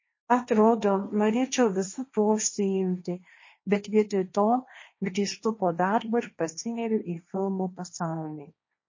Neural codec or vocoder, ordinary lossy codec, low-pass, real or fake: codec, 16 kHz, 1.1 kbps, Voila-Tokenizer; MP3, 32 kbps; 7.2 kHz; fake